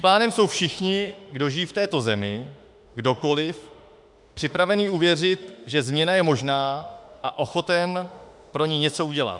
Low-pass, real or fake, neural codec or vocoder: 10.8 kHz; fake; autoencoder, 48 kHz, 32 numbers a frame, DAC-VAE, trained on Japanese speech